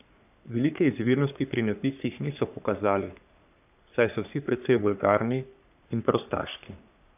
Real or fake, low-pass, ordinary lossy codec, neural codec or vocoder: fake; 3.6 kHz; none; codec, 44.1 kHz, 3.4 kbps, Pupu-Codec